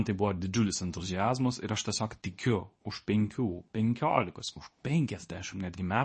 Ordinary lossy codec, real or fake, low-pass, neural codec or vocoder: MP3, 32 kbps; fake; 10.8 kHz; codec, 24 kHz, 0.9 kbps, WavTokenizer, medium speech release version 2